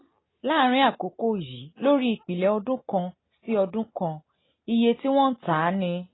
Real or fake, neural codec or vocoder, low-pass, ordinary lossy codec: real; none; 7.2 kHz; AAC, 16 kbps